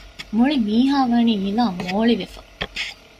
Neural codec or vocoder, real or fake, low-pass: none; real; 14.4 kHz